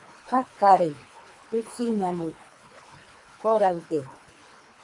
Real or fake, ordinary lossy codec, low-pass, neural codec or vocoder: fake; MP3, 64 kbps; 10.8 kHz; codec, 24 kHz, 3 kbps, HILCodec